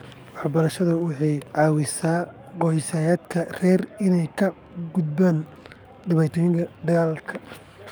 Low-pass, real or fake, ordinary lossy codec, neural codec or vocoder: none; fake; none; codec, 44.1 kHz, 7.8 kbps, DAC